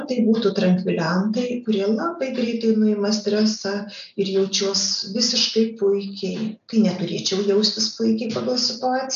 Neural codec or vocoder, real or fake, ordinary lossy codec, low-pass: none; real; AAC, 96 kbps; 7.2 kHz